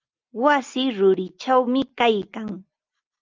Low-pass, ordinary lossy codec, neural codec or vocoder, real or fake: 7.2 kHz; Opus, 32 kbps; none; real